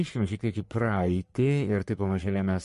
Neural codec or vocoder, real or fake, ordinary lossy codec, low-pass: codec, 44.1 kHz, 3.4 kbps, Pupu-Codec; fake; MP3, 48 kbps; 14.4 kHz